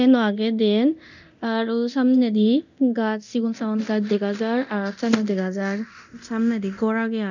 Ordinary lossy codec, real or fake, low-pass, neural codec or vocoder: none; fake; 7.2 kHz; codec, 24 kHz, 0.9 kbps, DualCodec